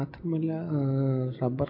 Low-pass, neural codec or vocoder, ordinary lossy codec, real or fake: 5.4 kHz; none; AAC, 32 kbps; real